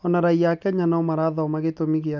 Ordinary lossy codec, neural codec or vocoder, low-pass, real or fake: none; none; 7.2 kHz; real